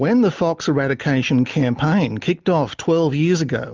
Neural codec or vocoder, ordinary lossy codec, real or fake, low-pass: none; Opus, 24 kbps; real; 7.2 kHz